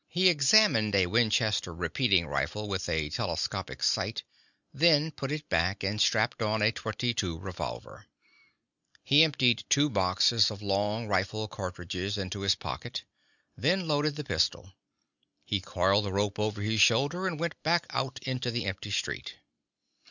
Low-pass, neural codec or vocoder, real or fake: 7.2 kHz; none; real